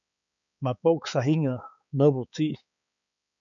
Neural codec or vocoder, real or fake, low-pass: codec, 16 kHz, 4 kbps, X-Codec, HuBERT features, trained on balanced general audio; fake; 7.2 kHz